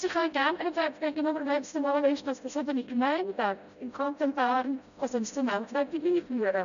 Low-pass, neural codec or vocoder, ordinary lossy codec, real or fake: 7.2 kHz; codec, 16 kHz, 0.5 kbps, FreqCodec, smaller model; none; fake